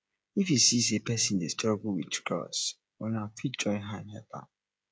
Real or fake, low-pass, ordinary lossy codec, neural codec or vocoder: fake; none; none; codec, 16 kHz, 16 kbps, FreqCodec, smaller model